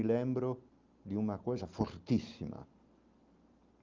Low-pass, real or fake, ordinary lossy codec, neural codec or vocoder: 7.2 kHz; real; Opus, 32 kbps; none